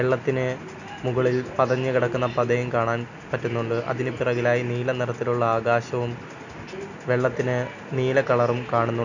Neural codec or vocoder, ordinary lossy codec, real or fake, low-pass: none; none; real; 7.2 kHz